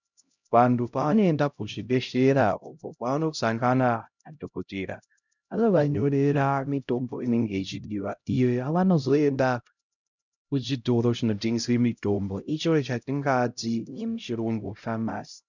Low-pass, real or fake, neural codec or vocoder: 7.2 kHz; fake; codec, 16 kHz, 0.5 kbps, X-Codec, HuBERT features, trained on LibriSpeech